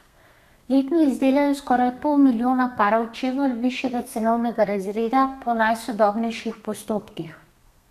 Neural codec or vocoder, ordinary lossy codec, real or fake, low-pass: codec, 32 kHz, 1.9 kbps, SNAC; none; fake; 14.4 kHz